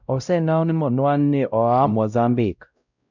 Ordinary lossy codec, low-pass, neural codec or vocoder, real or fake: none; 7.2 kHz; codec, 16 kHz, 0.5 kbps, X-Codec, WavLM features, trained on Multilingual LibriSpeech; fake